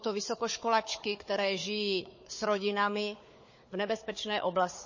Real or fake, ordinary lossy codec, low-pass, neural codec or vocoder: fake; MP3, 32 kbps; 7.2 kHz; codec, 16 kHz, 4 kbps, FunCodec, trained on Chinese and English, 50 frames a second